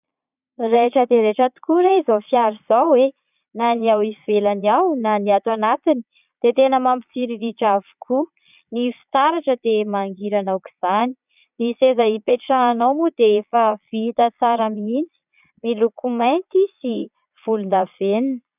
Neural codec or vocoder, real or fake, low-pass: vocoder, 22.05 kHz, 80 mel bands, Vocos; fake; 3.6 kHz